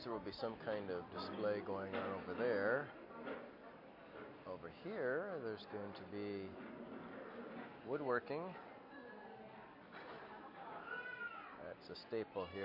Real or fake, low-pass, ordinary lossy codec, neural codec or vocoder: real; 5.4 kHz; MP3, 32 kbps; none